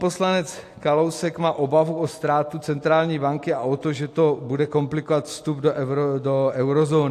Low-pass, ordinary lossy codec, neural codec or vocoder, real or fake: 14.4 kHz; AAC, 64 kbps; none; real